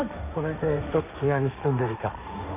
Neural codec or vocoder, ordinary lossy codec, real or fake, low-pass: codec, 16 kHz, 1.1 kbps, Voila-Tokenizer; none; fake; 3.6 kHz